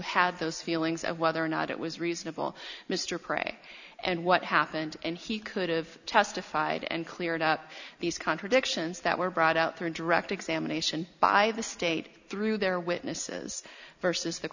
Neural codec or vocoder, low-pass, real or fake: none; 7.2 kHz; real